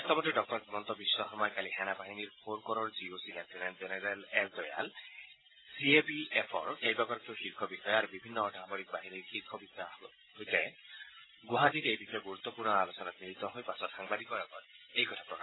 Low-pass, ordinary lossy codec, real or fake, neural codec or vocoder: 7.2 kHz; AAC, 16 kbps; real; none